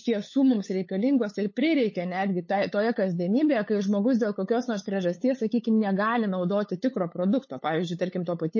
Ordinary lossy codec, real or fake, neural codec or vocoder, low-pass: MP3, 32 kbps; fake; codec, 16 kHz, 8 kbps, FunCodec, trained on LibriTTS, 25 frames a second; 7.2 kHz